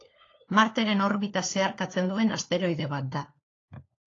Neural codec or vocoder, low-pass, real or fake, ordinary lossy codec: codec, 16 kHz, 4 kbps, FunCodec, trained on LibriTTS, 50 frames a second; 7.2 kHz; fake; AAC, 32 kbps